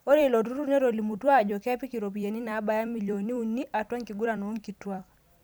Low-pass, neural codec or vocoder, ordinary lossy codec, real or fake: none; vocoder, 44.1 kHz, 128 mel bands every 256 samples, BigVGAN v2; none; fake